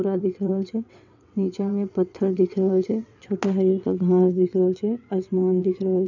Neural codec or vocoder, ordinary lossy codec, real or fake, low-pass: vocoder, 22.05 kHz, 80 mel bands, WaveNeXt; none; fake; 7.2 kHz